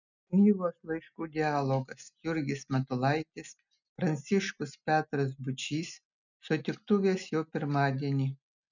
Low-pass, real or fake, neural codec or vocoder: 7.2 kHz; real; none